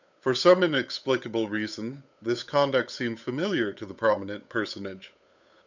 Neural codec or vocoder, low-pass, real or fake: codec, 16 kHz, 8 kbps, FunCodec, trained on Chinese and English, 25 frames a second; 7.2 kHz; fake